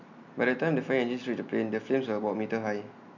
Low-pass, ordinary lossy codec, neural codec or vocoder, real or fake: 7.2 kHz; none; none; real